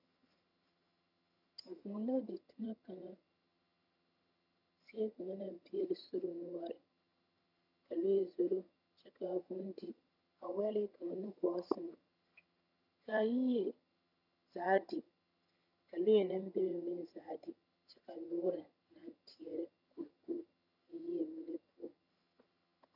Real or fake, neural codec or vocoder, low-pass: fake; vocoder, 22.05 kHz, 80 mel bands, HiFi-GAN; 5.4 kHz